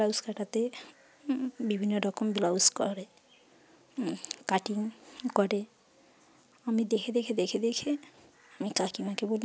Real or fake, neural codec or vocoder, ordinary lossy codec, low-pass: real; none; none; none